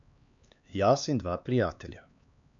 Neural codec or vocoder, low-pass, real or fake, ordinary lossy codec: codec, 16 kHz, 4 kbps, X-Codec, HuBERT features, trained on LibriSpeech; 7.2 kHz; fake; none